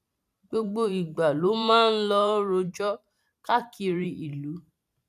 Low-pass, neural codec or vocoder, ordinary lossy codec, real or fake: 14.4 kHz; vocoder, 44.1 kHz, 128 mel bands every 512 samples, BigVGAN v2; none; fake